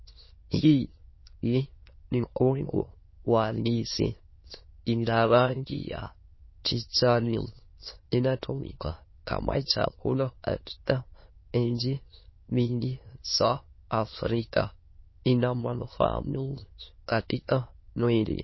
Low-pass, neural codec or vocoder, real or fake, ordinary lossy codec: 7.2 kHz; autoencoder, 22.05 kHz, a latent of 192 numbers a frame, VITS, trained on many speakers; fake; MP3, 24 kbps